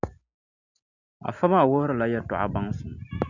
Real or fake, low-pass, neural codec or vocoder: real; 7.2 kHz; none